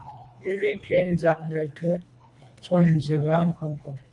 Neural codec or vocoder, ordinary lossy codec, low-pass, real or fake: codec, 24 kHz, 1.5 kbps, HILCodec; MP3, 64 kbps; 10.8 kHz; fake